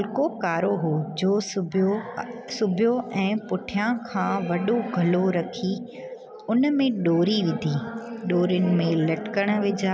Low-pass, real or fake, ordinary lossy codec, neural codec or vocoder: none; real; none; none